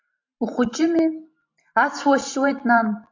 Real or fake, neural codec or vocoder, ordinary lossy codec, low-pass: fake; vocoder, 44.1 kHz, 128 mel bands every 512 samples, BigVGAN v2; AAC, 48 kbps; 7.2 kHz